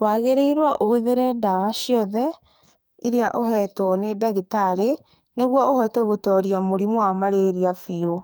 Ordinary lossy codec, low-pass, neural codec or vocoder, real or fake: none; none; codec, 44.1 kHz, 2.6 kbps, SNAC; fake